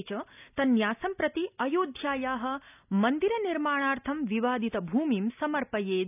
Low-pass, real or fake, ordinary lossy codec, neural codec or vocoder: 3.6 kHz; real; none; none